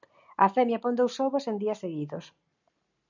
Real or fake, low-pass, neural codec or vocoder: real; 7.2 kHz; none